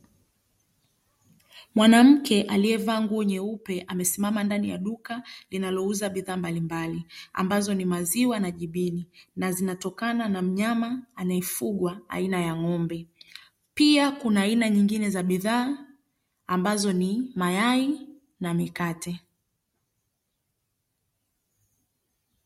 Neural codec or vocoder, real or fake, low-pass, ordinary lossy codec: none; real; 19.8 kHz; MP3, 64 kbps